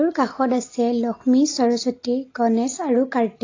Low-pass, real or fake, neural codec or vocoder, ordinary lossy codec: 7.2 kHz; real; none; AAC, 32 kbps